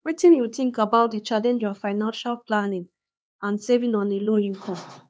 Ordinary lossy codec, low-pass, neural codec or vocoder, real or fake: none; none; codec, 16 kHz, 2 kbps, X-Codec, HuBERT features, trained on LibriSpeech; fake